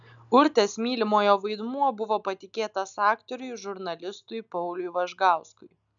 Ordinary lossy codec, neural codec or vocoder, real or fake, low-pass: MP3, 96 kbps; none; real; 7.2 kHz